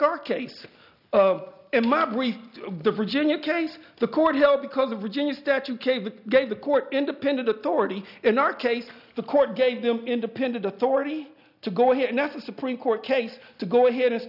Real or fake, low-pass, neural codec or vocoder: real; 5.4 kHz; none